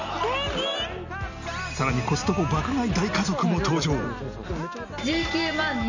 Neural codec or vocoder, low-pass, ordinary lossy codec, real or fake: none; 7.2 kHz; none; real